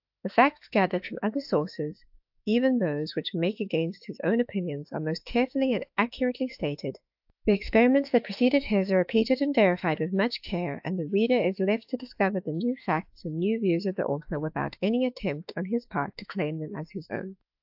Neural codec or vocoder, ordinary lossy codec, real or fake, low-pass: autoencoder, 48 kHz, 32 numbers a frame, DAC-VAE, trained on Japanese speech; AAC, 48 kbps; fake; 5.4 kHz